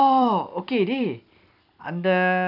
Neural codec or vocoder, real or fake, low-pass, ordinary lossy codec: vocoder, 44.1 kHz, 128 mel bands every 256 samples, BigVGAN v2; fake; 5.4 kHz; none